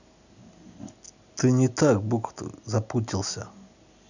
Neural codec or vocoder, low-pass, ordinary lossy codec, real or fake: none; 7.2 kHz; none; real